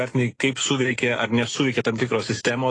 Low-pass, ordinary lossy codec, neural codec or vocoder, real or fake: 10.8 kHz; AAC, 32 kbps; autoencoder, 48 kHz, 128 numbers a frame, DAC-VAE, trained on Japanese speech; fake